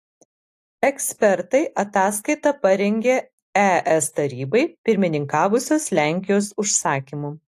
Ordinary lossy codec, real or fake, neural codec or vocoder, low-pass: AAC, 64 kbps; real; none; 14.4 kHz